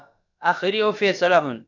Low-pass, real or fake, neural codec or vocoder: 7.2 kHz; fake; codec, 16 kHz, about 1 kbps, DyCAST, with the encoder's durations